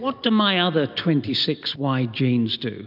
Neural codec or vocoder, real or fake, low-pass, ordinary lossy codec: none; real; 5.4 kHz; AAC, 48 kbps